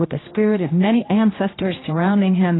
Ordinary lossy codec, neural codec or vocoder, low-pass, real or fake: AAC, 16 kbps; codec, 16 kHz, 1 kbps, X-Codec, HuBERT features, trained on general audio; 7.2 kHz; fake